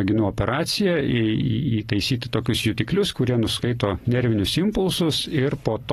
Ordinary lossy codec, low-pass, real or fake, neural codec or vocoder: AAC, 32 kbps; 19.8 kHz; fake; vocoder, 44.1 kHz, 128 mel bands every 256 samples, BigVGAN v2